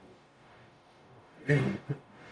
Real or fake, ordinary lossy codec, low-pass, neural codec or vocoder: fake; none; 9.9 kHz; codec, 44.1 kHz, 0.9 kbps, DAC